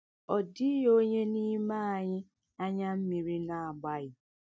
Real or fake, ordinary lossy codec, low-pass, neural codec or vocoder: real; none; none; none